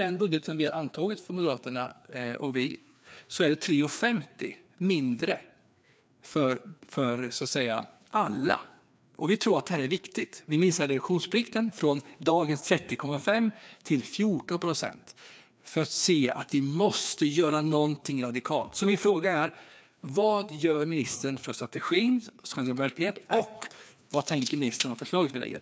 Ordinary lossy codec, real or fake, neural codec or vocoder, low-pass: none; fake; codec, 16 kHz, 2 kbps, FreqCodec, larger model; none